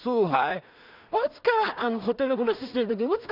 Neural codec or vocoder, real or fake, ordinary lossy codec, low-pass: codec, 16 kHz in and 24 kHz out, 0.4 kbps, LongCat-Audio-Codec, two codebook decoder; fake; none; 5.4 kHz